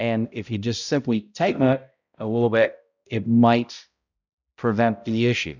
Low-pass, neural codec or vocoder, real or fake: 7.2 kHz; codec, 16 kHz, 0.5 kbps, X-Codec, HuBERT features, trained on balanced general audio; fake